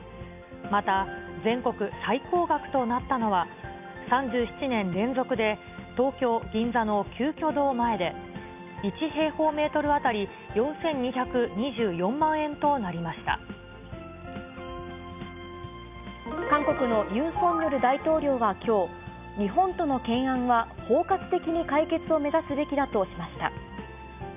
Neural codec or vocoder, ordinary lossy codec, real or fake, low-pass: none; none; real; 3.6 kHz